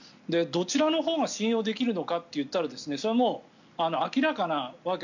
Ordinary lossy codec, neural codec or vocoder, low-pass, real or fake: none; none; 7.2 kHz; real